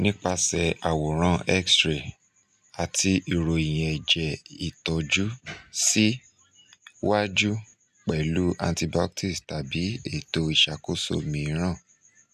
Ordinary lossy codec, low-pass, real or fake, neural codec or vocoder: none; 14.4 kHz; real; none